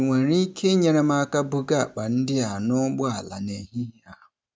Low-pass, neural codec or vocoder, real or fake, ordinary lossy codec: none; none; real; none